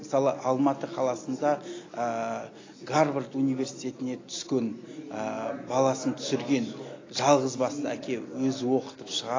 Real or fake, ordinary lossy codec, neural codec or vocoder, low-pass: real; AAC, 32 kbps; none; 7.2 kHz